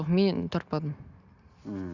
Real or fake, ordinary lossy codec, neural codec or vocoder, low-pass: real; Opus, 64 kbps; none; 7.2 kHz